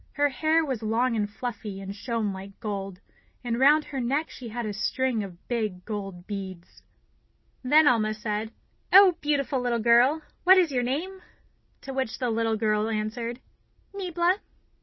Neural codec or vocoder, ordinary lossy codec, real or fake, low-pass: none; MP3, 24 kbps; real; 7.2 kHz